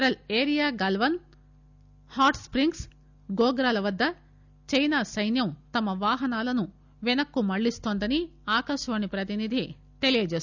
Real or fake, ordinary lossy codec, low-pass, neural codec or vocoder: real; none; 7.2 kHz; none